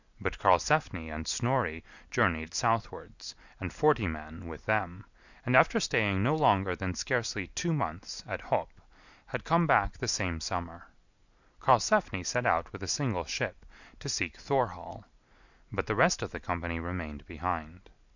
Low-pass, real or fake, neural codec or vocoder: 7.2 kHz; real; none